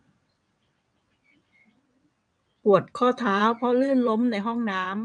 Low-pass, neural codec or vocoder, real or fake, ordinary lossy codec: 9.9 kHz; vocoder, 22.05 kHz, 80 mel bands, WaveNeXt; fake; MP3, 96 kbps